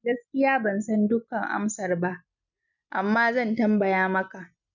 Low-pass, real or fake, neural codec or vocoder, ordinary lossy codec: 7.2 kHz; real; none; none